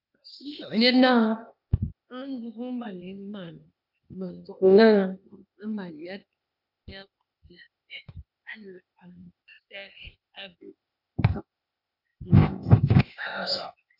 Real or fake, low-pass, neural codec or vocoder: fake; 5.4 kHz; codec, 16 kHz, 0.8 kbps, ZipCodec